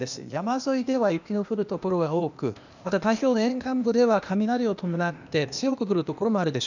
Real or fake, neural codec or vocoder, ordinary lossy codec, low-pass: fake; codec, 16 kHz, 0.8 kbps, ZipCodec; none; 7.2 kHz